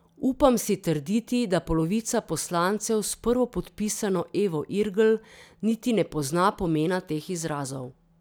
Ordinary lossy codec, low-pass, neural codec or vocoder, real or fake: none; none; none; real